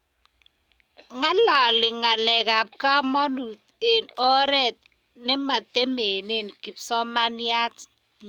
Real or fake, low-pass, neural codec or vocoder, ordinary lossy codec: fake; 19.8 kHz; codec, 44.1 kHz, 7.8 kbps, DAC; Opus, 64 kbps